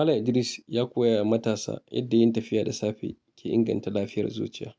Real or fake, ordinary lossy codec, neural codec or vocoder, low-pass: real; none; none; none